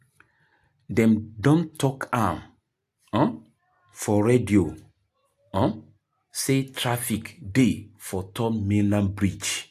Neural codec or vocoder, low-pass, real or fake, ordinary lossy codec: none; 14.4 kHz; real; AAC, 96 kbps